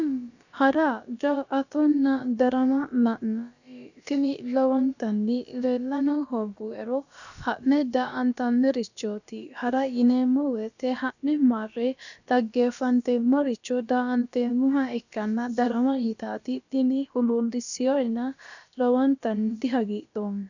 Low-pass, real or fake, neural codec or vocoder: 7.2 kHz; fake; codec, 16 kHz, about 1 kbps, DyCAST, with the encoder's durations